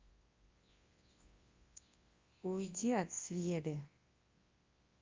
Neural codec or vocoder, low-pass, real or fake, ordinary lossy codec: codec, 24 kHz, 0.9 kbps, WavTokenizer, large speech release; 7.2 kHz; fake; Opus, 32 kbps